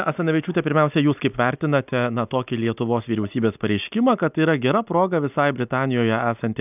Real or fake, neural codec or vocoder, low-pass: real; none; 3.6 kHz